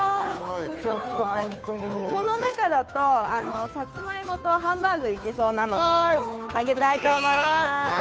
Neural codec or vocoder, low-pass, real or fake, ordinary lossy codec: codec, 16 kHz, 2 kbps, FunCodec, trained on Chinese and English, 25 frames a second; none; fake; none